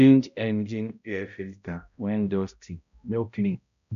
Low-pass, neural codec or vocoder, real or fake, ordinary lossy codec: 7.2 kHz; codec, 16 kHz, 0.5 kbps, X-Codec, HuBERT features, trained on balanced general audio; fake; none